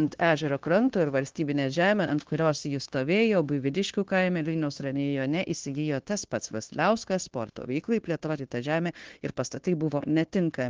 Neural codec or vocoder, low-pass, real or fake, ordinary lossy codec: codec, 16 kHz, 0.9 kbps, LongCat-Audio-Codec; 7.2 kHz; fake; Opus, 16 kbps